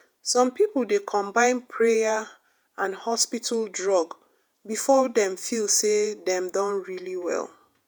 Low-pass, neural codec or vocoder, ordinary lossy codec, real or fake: none; vocoder, 48 kHz, 128 mel bands, Vocos; none; fake